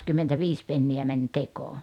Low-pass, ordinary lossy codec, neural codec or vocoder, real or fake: 19.8 kHz; none; vocoder, 44.1 kHz, 128 mel bands every 256 samples, BigVGAN v2; fake